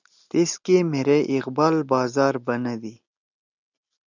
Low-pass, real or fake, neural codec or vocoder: 7.2 kHz; real; none